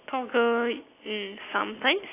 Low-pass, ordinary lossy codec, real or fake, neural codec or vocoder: 3.6 kHz; AAC, 24 kbps; real; none